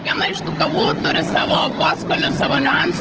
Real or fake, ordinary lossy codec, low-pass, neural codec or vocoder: fake; Opus, 16 kbps; 7.2 kHz; codec, 16 kHz, 16 kbps, FunCodec, trained on LibriTTS, 50 frames a second